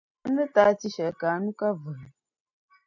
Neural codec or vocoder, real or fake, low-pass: none; real; 7.2 kHz